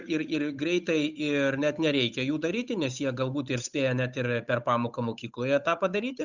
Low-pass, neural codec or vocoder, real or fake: 7.2 kHz; codec, 16 kHz, 8 kbps, FunCodec, trained on Chinese and English, 25 frames a second; fake